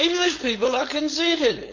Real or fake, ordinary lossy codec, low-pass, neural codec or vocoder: fake; MP3, 48 kbps; 7.2 kHz; codec, 16 kHz, 4.8 kbps, FACodec